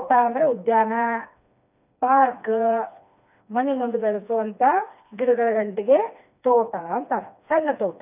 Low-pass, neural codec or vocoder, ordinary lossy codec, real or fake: 3.6 kHz; codec, 16 kHz, 2 kbps, FreqCodec, smaller model; none; fake